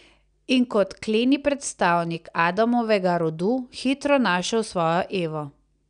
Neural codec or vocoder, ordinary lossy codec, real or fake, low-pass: none; none; real; 9.9 kHz